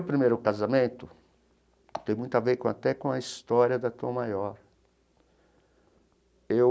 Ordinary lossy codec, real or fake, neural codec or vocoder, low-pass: none; real; none; none